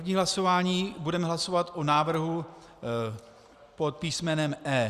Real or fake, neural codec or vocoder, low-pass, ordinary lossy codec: real; none; 14.4 kHz; Opus, 64 kbps